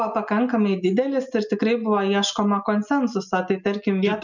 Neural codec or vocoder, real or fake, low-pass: none; real; 7.2 kHz